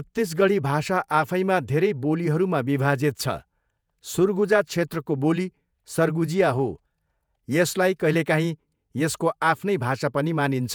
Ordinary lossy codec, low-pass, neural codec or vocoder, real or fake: none; none; vocoder, 48 kHz, 128 mel bands, Vocos; fake